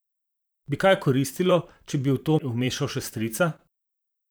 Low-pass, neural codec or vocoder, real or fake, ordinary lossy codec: none; vocoder, 44.1 kHz, 128 mel bands, Pupu-Vocoder; fake; none